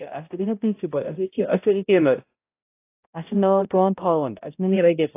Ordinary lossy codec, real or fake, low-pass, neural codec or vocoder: AAC, 24 kbps; fake; 3.6 kHz; codec, 16 kHz, 0.5 kbps, X-Codec, HuBERT features, trained on balanced general audio